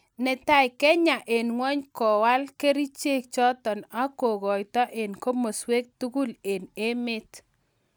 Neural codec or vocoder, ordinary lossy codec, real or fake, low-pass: none; none; real; none